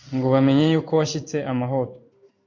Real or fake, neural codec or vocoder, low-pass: fake; codec, 16 kHz in and 24 kHz out, 1 kbps, XY-Tokenizer; 7.2 kHz